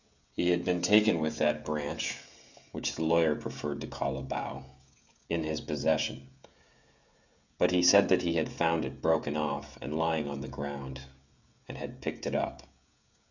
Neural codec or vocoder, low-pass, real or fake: codec, 16 kHz, 16 kbps, FreqCodec, smaller model; 7.2 kHz; fake